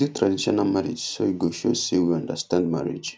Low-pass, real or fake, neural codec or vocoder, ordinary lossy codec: none; real; none; none